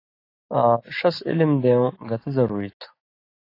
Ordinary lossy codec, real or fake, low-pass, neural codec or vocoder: AAC, 32 kbps; real; 5.4 kHz; none